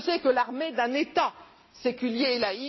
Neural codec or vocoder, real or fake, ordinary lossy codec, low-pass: none; real; MP3, 24 kbps; 7.2 kHz